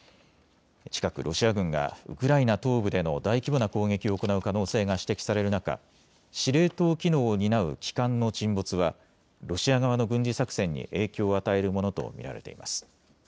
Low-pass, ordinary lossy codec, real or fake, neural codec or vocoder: none; none; real; none